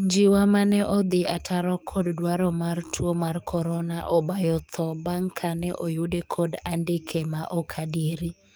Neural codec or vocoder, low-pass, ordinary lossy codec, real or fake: codec, 44.1 kHz, 7.8 kbps, DAC; none; none; fake